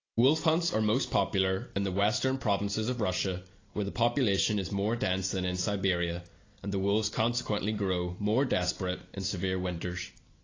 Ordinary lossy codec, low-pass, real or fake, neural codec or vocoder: AAC, 32 kbps; 7.2 kHz; real; none